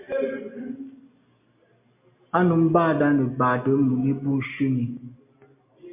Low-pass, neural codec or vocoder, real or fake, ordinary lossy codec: 3.6 kHz; none; real; MP3, 24 kbps